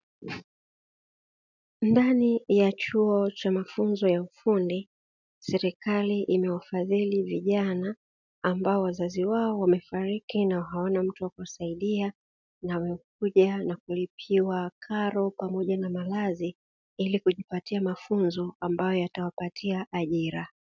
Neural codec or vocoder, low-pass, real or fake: none; 7.2 kHz; real